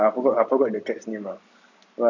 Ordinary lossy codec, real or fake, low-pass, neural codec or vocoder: none; real; 7.2 kHz; none